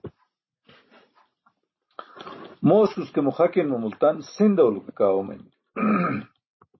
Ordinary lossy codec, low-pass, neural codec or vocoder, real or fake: MP3, 24 kbps; 7.2 kHz; none; real